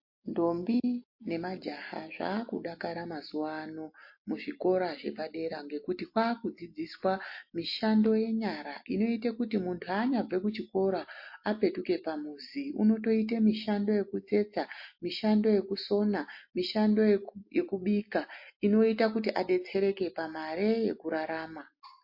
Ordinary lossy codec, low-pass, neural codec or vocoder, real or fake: MP3, 32 kbps; 5.4 kHz; none; real